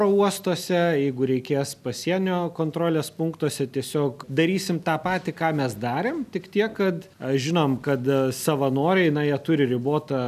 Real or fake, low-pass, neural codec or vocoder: real; 14.4 kHz; none